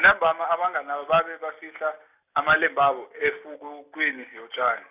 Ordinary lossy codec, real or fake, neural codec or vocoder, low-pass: none; real; none; 3.6 kHz